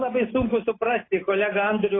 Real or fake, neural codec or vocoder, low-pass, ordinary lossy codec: real; none; 7.2 kHz; AAC, 16 kbps